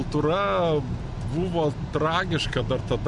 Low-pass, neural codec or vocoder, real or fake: 10.8 kHz; none; real